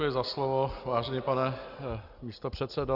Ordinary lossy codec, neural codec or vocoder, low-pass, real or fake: Opus, 64 kbps; none; 5.4 kHz; real